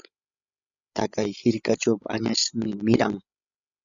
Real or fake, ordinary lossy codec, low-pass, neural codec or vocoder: fake; Opus, 64 kbps; 7.2 kHz; codec, 16 kHz, 16 kbps, FreqCodec, larger model